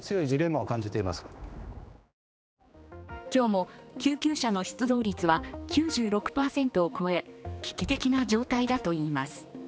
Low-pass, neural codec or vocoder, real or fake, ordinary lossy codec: none; codec, 16 kHz, 2 kbps, X-Codec, HuBERT features, trained on general audio; fake; none